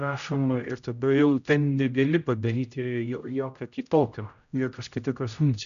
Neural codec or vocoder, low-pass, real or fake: codec, 16 kHz, 0.5 kbps, X-Codec, HuBERT features, trained on general audio; 7.2 kHz; fake